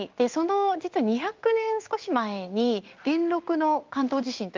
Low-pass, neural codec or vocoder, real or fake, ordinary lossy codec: 7.2 kHz; codec, 16 kHz in and 24 kHz out, 1 kbps, XY-Tokenizer; fake; Opus, 24 kbps